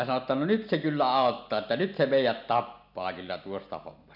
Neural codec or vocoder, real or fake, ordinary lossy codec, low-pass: none; real; none; 5.4 kHz